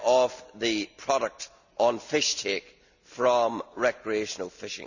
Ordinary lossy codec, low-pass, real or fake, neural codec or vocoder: none; 7.2 kHz; real; none